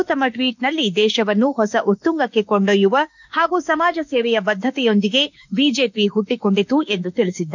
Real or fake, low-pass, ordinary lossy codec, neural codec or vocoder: fake; 7.2 kHz; none; autoencoder, 48 kHz, 32 numbers a frame, DAC-VAE, trained on Japanese speech